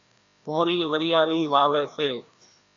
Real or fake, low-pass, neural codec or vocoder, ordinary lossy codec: fake; 7.2 kHz; codec, 16 kHz, 1 kbps, FreqCodec, larger model; Opus, 64 kbps